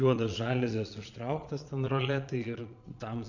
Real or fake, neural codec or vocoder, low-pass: fake; vocoder, 22.05 kHz, 80 mel bands, Vocos; 7.2 kHz